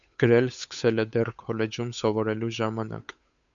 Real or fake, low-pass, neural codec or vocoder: fake; 7.2 kHz; codec, 16 kHz, 8 kbps, FunCodec, trained on Chinese and English, 25 frames a second